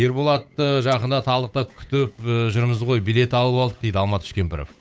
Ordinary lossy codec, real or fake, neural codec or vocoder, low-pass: none; fake; codec, 16 kHz, 8 kbps, FunCodec, trained on Chinese and English, 25 frames a second; none